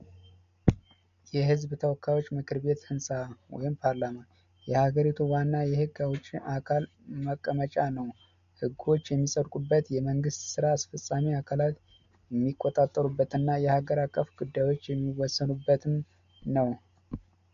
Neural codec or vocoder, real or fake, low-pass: none; real; 7.2 kHz